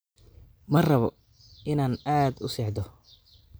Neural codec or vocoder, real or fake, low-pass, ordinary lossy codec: none; real; none; none